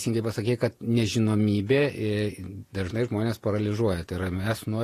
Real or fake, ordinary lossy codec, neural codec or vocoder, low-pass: fake; AAC, 48 kbps; vocoder, 48 kHz, 128 mel bands, Vocos; 14.4 kHz